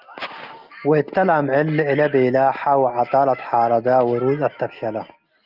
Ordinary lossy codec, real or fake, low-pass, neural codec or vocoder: Opus, 32 kbps; real; 5.4 kHz; none